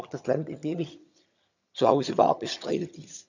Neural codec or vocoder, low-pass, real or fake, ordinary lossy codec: vocoder, 22.05 kHz, 80 mel bands, HiFi-GAN; 7.2 kHz; fake; none